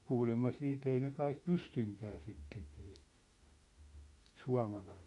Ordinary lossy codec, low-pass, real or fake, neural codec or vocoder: MP3, 48 kbps; 14.4 kHz; fake; autoencoder, 48 kHz, 32 numbers a frame, DAC-VAE, trained on Japanese speech